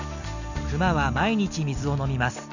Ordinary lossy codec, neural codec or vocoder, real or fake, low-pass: none; none; real; 7.2 kHz